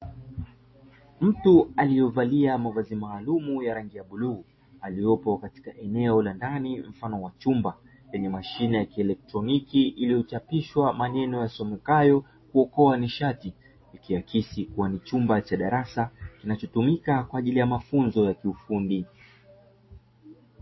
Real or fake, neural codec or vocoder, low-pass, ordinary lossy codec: real; none; 7.2 kHz; MP3, 24 kbps